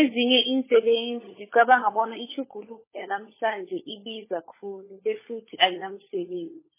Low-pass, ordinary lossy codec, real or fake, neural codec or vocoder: 3.6 kHz; MP3, 16 kbps; fake; codec, 16 kHz, 16 kbps, FunCodec, trained on LibriTTS, 50 frames a second